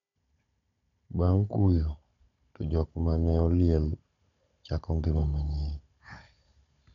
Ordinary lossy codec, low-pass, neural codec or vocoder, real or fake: none; 7.2 kHz; codec, 16 kHz, 16 kbps, FunCodec, trained on Chinese and English, 50 frames a second; fake